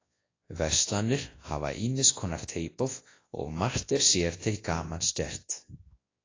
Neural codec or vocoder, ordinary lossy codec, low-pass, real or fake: codec, 24 kHz, 0.9 kbps, WavTokenizer, large speech release; AAC, 32 kbps; 7.2 kHz; fake